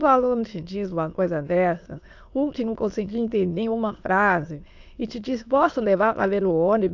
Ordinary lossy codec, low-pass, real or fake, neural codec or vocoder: AAC, 48 kbps; 7.2 kHz; fake; autoencoder, 22.05 kHz, a latent of 192 numbers a frame, VITS, trained on many speakers